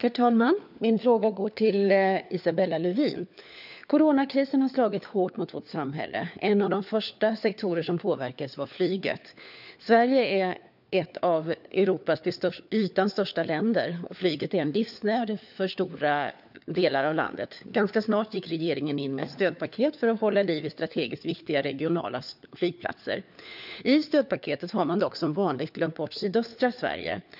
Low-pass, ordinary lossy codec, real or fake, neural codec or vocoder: 5.4 kHz; none; fake; codec, 16 kHz, 4 kbps, FunCodec, trained on LibriTTS, 50 frames a second